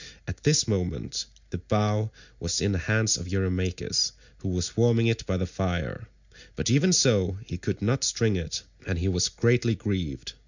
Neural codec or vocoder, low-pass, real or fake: none; 7.2 kHz; real